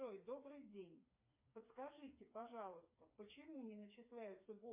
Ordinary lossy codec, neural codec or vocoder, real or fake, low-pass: MP3, 24 kbps; codec, 16 kHz, 4 kbps, FreqCodec, smaller model; fake; 3.6 kHz